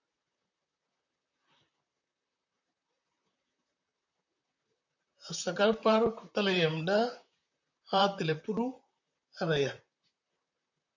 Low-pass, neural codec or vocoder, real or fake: 7.2 kHz; vocoder, 44.1 kHz, 128 mel bands, Pupu-Vocoder; fake